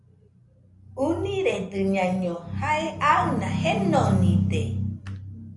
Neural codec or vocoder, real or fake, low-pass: none; real; 10.8 kHz